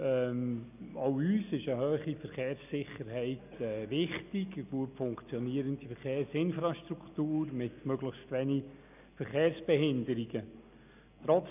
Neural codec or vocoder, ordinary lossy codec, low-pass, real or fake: none; none; 3.6 kHz; real